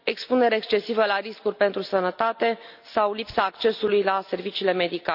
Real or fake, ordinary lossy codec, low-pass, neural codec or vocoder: real; none; 5.4 kHz; none